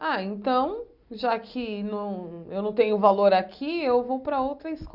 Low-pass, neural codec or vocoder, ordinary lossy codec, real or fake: 5.4 kHz; none; Opus, 64 kbps; real